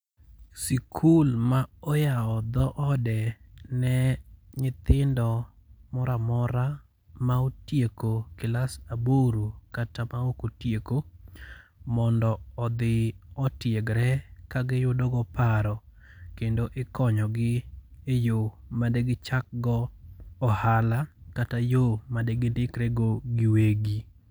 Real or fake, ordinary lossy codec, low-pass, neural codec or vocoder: real; none; none; none